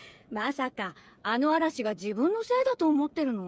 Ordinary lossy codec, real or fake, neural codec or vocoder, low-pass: none; fake; codec, 16 kHz, 8 kbps, FreqCodec, smaller model; none